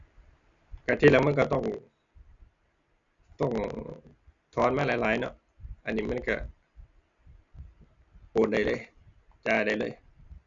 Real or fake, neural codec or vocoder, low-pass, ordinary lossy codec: real; none; 7.2 kHz; none